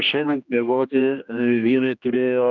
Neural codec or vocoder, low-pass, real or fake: codec, 16 kHz, 1 kbps, X-Codec, HuBERT features, trained on general audio; 7.2 kHz; fake